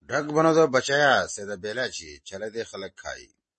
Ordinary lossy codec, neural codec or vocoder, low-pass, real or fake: MP3, 32 kbps; none; 10.8 kHz; real